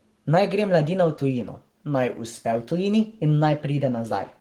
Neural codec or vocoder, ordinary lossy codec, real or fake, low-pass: codec, 44.1 kHz, 7.8 kbps, Pupu-Codec; Opus, 16 kbps; fake; 19.8 kHz